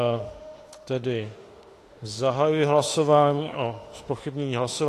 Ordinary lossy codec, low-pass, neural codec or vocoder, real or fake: AAC, 48 kbps; 14.4 kHz; autoencoder, 48 kHz, 32 numbers a frame, DAC-VAE, trained on Japanese speech; fake